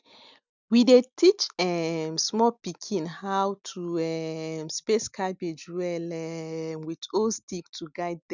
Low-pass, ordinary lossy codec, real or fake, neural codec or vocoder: 7.2 kHz; none; real; none